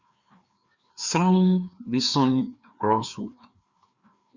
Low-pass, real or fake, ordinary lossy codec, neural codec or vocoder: 7.2 kHz; fake; Opus, 64 kbps; codec, 16 kHz, 2 kbps, FreqCodec, larger model